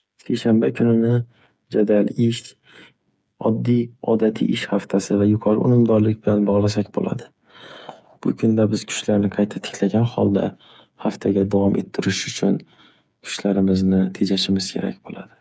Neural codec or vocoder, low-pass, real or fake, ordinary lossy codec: codec, 16 kHz, 8 kbps, FreqCodec, smaller model; none; fake; none